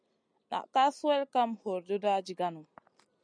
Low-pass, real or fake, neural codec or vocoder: 9.9 kHz; real; none